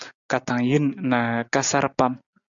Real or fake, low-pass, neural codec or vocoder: real; 7.2 kHz; none